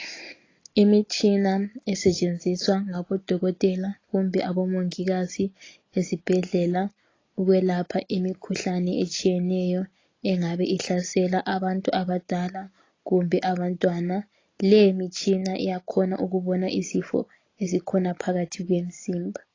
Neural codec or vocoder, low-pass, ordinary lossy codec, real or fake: none; 7.2 kHz; AAC, 32 kbps; real